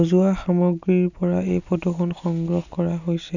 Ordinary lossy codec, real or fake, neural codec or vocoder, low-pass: none; real; none; 7.2 kHz